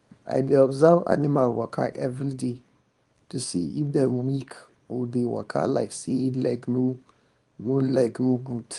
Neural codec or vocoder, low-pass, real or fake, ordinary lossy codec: codec, 24 kHz, 0.9 kbps, WavTokenizer, small release; 10.8 kHz; fake; Opus, 32 kbps